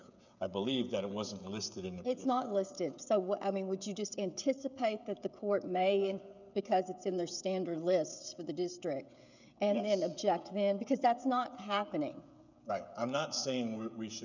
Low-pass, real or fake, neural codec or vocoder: 7.2 kHz; fake; codec, 16 kHz, 16 kbps, FreqCodec, smaller model